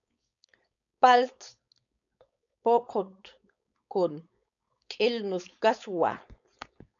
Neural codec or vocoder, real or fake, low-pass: codec, 16 kHz, 4.8 kbps, FACodec; fake; 7.2 kHz